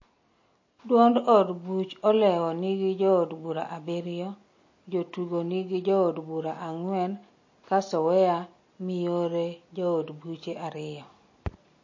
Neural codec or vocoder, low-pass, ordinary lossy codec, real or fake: none; 7.2 kHz; MP3, 32 kbps; real